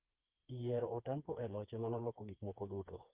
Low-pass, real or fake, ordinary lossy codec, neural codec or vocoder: 3.6 kHz; fake; Opus, 32 kbps; codec, 16 kHz, 4 kbps, FreqCodec, smaller model